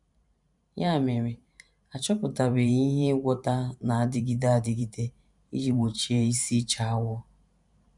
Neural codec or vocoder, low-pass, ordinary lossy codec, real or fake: vocoder, 44.1 kHz, 128 mel bands every 512 samples, BigVGAN v2; 10.8 kHz; none; fake